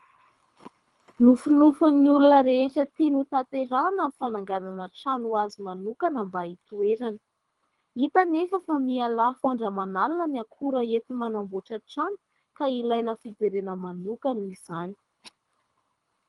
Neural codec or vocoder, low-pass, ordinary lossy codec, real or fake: codec, 24 kHz, 3 kbps, HILCodec; 10.8 kHz; Opus, 32 kbps; fake